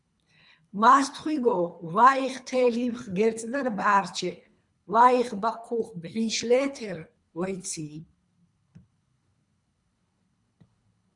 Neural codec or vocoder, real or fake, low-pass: codec, 24 kHz, 3 kbps, HILCodec; fake; 10.8 kHz